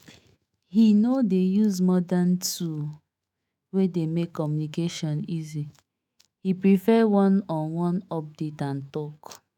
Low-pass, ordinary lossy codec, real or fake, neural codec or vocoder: 19.8 kHz; none; fake; autoencoder, 48 kHz, 128 numbers a frame, DAC-VAE, trained on Japanese speech